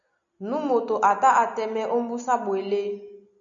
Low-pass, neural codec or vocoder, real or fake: 7.2 kHz; none; real